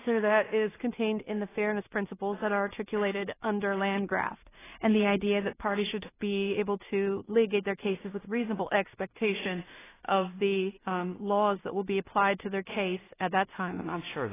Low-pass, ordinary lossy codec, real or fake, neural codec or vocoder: 3.6 kHz; AAC, 16 kbps; fake; codec, 16 kHz in and 24 kHz out, 0.4 kbps, LongCat-Audio-Codec, two codebook decoder